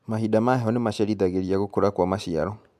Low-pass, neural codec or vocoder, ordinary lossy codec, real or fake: 14.4 kHz; none; MP3, 96 kbps; real